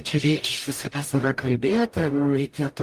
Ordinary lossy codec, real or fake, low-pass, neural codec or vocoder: Opus, 32 kbps; fake; 14.4 kHz; codec, 44.1 kHz, 0.9 kbps, DAC